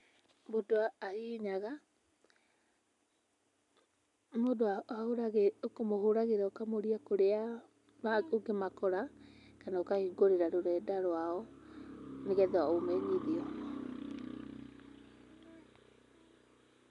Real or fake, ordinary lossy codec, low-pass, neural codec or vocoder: real; none; 10.8 kHz; none